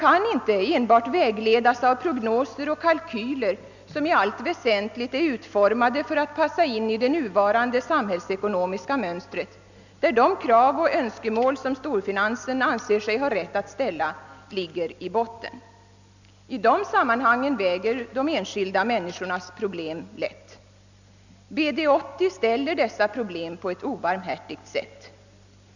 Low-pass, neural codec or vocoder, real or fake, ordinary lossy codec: 7.2 kHz; none; real; none